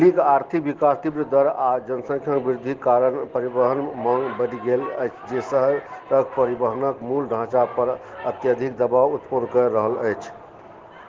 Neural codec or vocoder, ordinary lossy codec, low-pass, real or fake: none; Opus, 16 kbps; 7.2 kHz; real